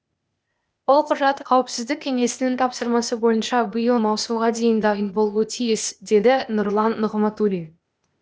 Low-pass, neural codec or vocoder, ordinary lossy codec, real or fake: none; codec, 16 kHz, 0.8 kbps, ZipCodec; none; fake